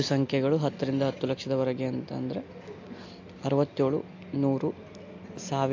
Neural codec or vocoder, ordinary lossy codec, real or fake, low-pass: none; AAC, 48 kbps; real; 7.2 kHz